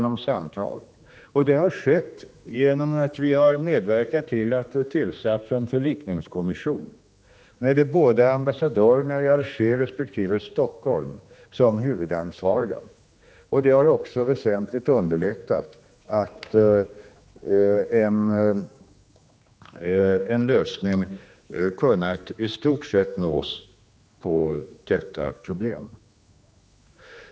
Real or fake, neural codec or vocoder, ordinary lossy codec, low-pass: fake; codec, 16 kHz, 2 kbps, X-Codec, HuBERT features, trained on general audio; none; none